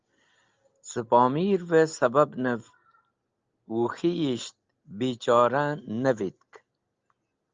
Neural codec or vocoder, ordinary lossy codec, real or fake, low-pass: none; Opus, 24 kbps; real; 7.2 kHz